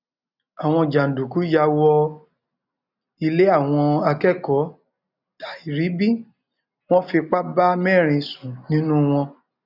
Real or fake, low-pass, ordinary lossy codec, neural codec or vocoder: real; 5.4 kHz; none; none